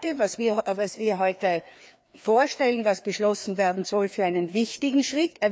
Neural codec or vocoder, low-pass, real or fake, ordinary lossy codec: codec, 16 kHz, 2 kbps, FreqCodec, larger model; none; fake; none